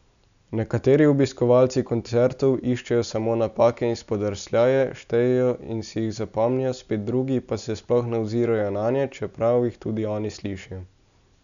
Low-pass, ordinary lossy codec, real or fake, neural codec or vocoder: 7.2 kHz; MP3, 96 kbps; real; none